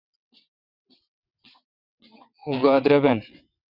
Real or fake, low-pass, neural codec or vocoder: fake; 5.4 kHz; vocoder, 22.05 kHz, 80 mel bands, WaveNeXt